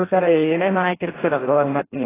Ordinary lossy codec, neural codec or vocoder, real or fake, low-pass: AAC, 16 kbps; codec, 16 kHz in and 24 kHz out, 0.6 kbps, FireRedTTS-2 codec; fake; 3.6 kHz